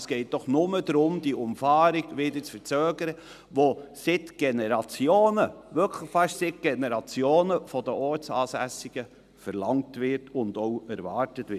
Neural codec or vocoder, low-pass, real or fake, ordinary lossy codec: none; 14.4 kHz; real; none